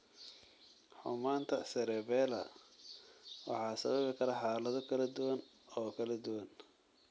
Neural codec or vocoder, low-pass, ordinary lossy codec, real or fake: none; none; none; real